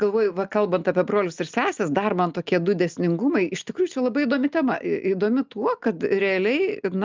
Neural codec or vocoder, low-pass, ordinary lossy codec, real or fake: none; 7.2 kHz; Opus, 24 kbps; real